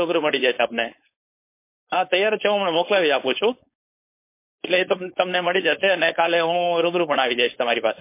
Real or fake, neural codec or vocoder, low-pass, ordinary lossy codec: fake; codec, 16 kHz, 4.8 kbps, FACodec; 3.6 kHz; MP3, 24 kbps